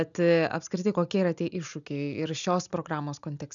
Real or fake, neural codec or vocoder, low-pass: real; none; 7.2 kHz